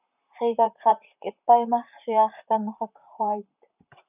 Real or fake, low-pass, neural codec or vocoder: fake; 3.6 kHz; vocoder, 44.1 kHz, 128 mel bands, Pupu-Vocoder